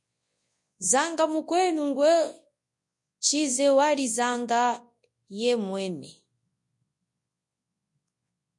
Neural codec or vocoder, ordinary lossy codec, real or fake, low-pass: codec, 24 kHz, 0.9 kbps, WavTokenizer, large speech release; MP3, 48 kbps; fake; 10.8 kHz